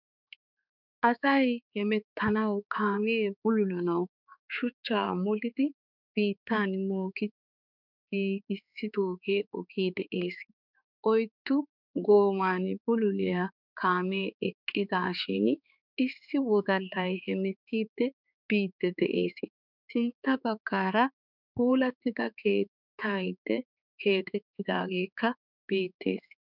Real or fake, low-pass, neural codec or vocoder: fake; 5.4 kHz; codec, 16 kHz, 4 kbps, X-Codec, HuBERT features, trained on balanced general audio